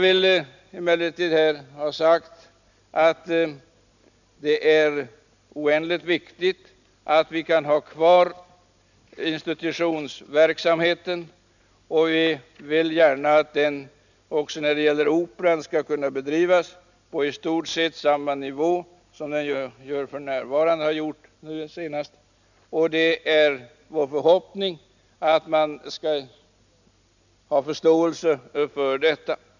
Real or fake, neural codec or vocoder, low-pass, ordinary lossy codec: real; none; 7.2 kHz; none